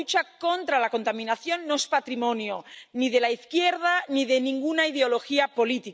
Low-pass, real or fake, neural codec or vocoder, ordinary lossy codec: none; real; none; none